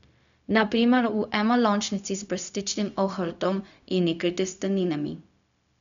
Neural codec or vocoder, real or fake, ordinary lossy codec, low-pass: codec, 16 kHz, 0.4 kbps, LongCat-Audio-Codec; fake; none; 7.2 kHz